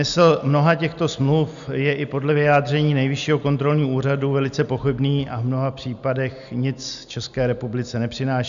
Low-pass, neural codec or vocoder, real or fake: 7.2 kHz; none; real